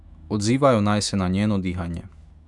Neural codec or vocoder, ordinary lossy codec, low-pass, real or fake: autoencoder, 48 kHz, 128 numbers a frame, DAC-VAE, trained on Japanese speech; none; 10.8 kHz; fake